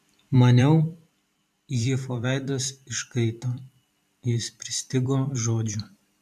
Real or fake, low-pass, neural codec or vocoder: fake; 14.4 kHz; vocoder, 44.1 kHz, 128 mel bands every 512 samples, BigVGAN v2